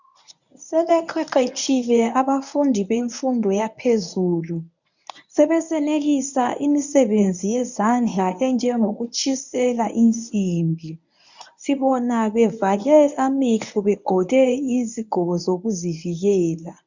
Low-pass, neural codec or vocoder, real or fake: 7.2 kHz; codec, 24 kHz, 0.9 kbps, WavTokenizer, medium speech release version 1; fake